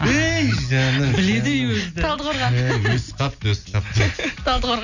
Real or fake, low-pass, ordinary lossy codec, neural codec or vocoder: real; 7.2 kHz; none; none